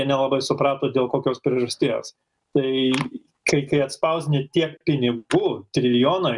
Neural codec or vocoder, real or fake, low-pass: none; real; 10.8 kHz